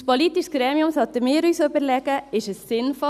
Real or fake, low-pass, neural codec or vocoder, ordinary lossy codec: real; 14.4 kHz; none; none